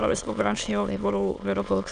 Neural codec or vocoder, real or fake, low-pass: autoencoder, 22.05 kHz, a latent of 192 numbers a frame, VITS, trained on many speakers; fake; 9.9 kHz